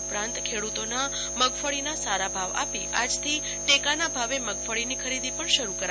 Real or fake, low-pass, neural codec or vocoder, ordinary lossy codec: real; none; none; none